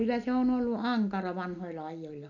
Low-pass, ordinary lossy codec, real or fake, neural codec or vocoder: 7.2 kHz; none; real; none